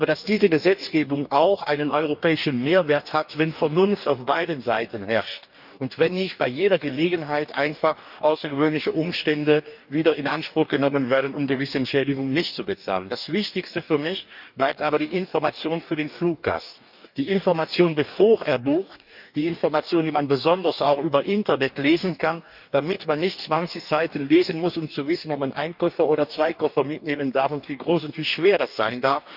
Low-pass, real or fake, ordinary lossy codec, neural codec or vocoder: 5.4 kHz; fake; none; codec, 44.1 kHz, 2.6 kbps, DAC